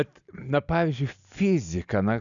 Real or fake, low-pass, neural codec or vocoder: real; 7.2 kHz; none